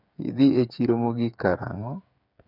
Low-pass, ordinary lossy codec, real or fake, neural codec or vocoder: 5.4 kHz; none; fake; codec, 16 kHz, 8 kbps, FreqCodec, smaller model